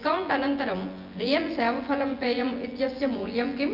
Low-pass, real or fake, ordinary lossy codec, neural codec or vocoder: 5.4 kHz; fake; Opus, 24 kbps; vocoder, 24 kHz, 100 mel bands, Vocos